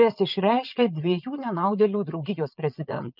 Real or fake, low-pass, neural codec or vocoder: fake; 5.4 kHz; vocoder, 22.05 kHz, 80 mel bands, Vocos